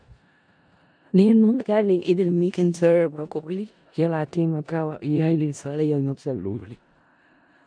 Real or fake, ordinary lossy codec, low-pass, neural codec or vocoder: fake; none; 9.9 kHz; codec, 16 kHz in and 24 kHz out, 0.4 kbps, LongCat-Audio-Codec, four codebook decoder